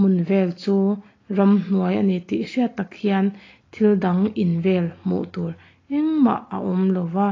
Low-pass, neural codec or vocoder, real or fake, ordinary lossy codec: 7.2 kHz; none; real; AAC, 32 kbps